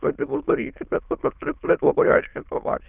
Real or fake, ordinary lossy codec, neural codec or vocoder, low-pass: fake; Opus, 24 kbps; autoencoder, 22.05 kHz, a latent of 192 numbers a frame, VITS, trained on many speakers; 3.6 kHz